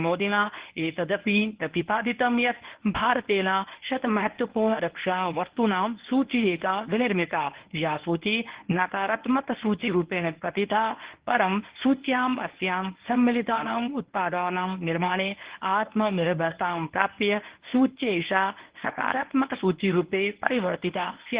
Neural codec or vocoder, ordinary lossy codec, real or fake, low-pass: codec, 24 kHz, 0.9 kbps, WavTokenizer, medium speech release version 1; Opus, 16 kbps; fake; 3.6 kHz